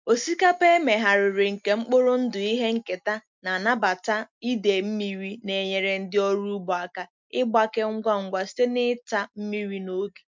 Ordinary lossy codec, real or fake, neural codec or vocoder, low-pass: MP3, 64 kbps; real; none; 7.2 kHz